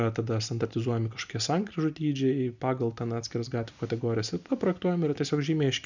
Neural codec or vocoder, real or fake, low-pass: none; real; 7.2 kHz